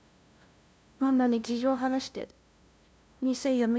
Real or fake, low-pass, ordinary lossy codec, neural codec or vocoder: fake; none; none; codec, 16 kHz, 0.5 kbps, FunCodec, trained on LibriTTS, 25 frames a second